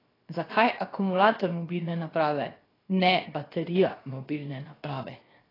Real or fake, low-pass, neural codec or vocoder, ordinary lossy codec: fake; 5.4 kHz; codec, 16 kHz, 0.7 kbps, FocalCodec; AAC, 24 kbps